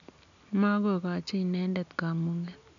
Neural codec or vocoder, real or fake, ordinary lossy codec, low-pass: none; real; none; 7.2 kHz